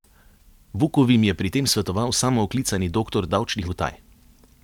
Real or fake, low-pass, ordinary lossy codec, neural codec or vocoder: real; 19.8 kHz; none; none